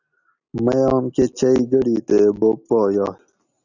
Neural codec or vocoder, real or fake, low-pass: none; real; 7.2 kHz